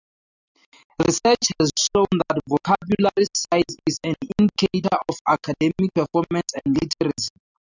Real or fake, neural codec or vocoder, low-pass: real; none; 7.2 kHz